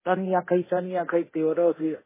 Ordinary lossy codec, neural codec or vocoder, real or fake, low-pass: MP3, 16 kbps; codec, 24 kHz, 0.9 kbps, DualCodec; fake; 3.6 kHz